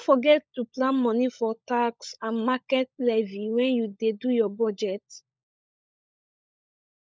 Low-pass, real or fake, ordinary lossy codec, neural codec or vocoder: none; fake; none; codec, 16 kHz, 16 kbps, FunCodec, trained on LibriTTS, 50 frames a second